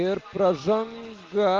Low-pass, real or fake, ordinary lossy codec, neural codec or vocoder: 7.2 kHz; real; Opus, 32 kbps; none